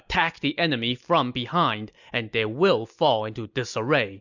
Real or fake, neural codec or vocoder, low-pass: real; none; 7.2 kHz